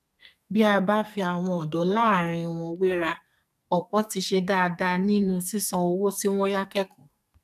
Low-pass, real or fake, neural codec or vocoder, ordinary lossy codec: 14.4 kHz; fake; codec, 44.1 kHz, 2.6 kbps, SNAC; none